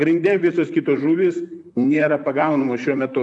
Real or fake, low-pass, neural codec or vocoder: fake; 10.8 kHz; vocoder, 44.1 kHz, 128 mel bands, Pupu-Vocoder